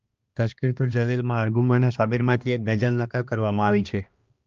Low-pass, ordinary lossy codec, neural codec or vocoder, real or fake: 7.2 kHz; Opus, 24 kbps; codec, 16 kHz, 1 kbps, X-Codec, HuBERT features, trained on balanced general audio; fake